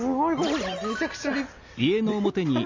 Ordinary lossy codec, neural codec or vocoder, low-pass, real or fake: MP3, 64 kbps; none; 7.2 kHz; real